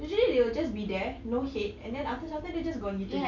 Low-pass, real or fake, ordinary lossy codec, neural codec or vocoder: 7.2 kHz; real; none; none